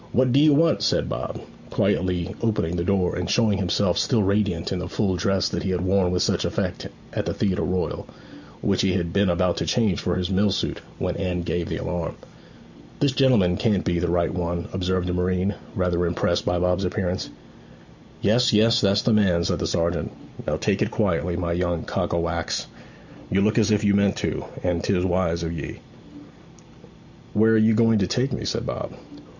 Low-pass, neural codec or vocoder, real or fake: 7.2 kHz; none; real